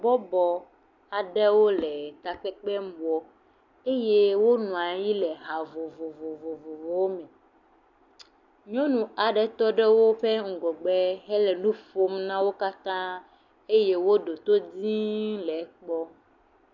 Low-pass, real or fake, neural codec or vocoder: 7.2 kHz; real; none